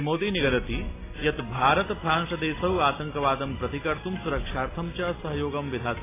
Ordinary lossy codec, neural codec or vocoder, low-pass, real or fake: AAC, 16 kbps; none; 3.6 kHz; real